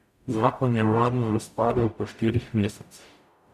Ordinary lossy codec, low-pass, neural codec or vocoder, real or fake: none; 14.4 kHz; codec, 44.1 kHz, 0.9 kbps, DAC; fake